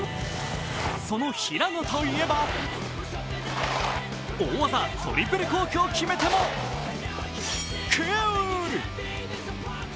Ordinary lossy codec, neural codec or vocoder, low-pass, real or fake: none; none; none; real